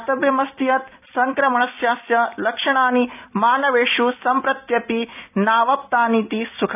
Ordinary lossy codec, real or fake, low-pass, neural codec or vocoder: none; real; 3.6 kHz; none